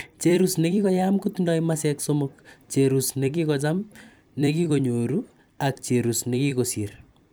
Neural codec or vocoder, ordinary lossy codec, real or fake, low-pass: vocoder, 44.1 kHz, 128 mel bands every 256 samples, BigVGAN v2; none; fake; none